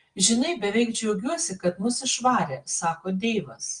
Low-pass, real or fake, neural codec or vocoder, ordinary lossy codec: 9.9 kHz; real; none; Opus, 24 kbps